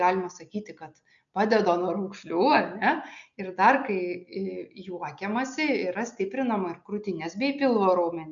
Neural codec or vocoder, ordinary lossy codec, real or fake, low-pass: none; MP3, 96 kbps; real; 7.2 kHz